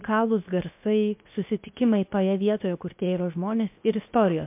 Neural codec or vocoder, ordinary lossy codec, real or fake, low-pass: codec, 16 kHz, 0.7 kbps, FocalCodec; MP3, 32 kbps; fake; 3.6 kHz